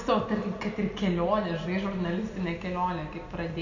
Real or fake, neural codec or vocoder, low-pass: real; none; 7.2 kHz